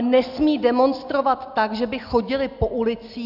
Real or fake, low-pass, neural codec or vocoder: real; 5.4 kHz; none